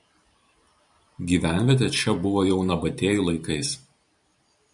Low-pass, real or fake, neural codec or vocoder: 10.8 kHz; fake; vocoder, 44.1 kHz, 128 mel bands every 512 samples, BigVGAN v2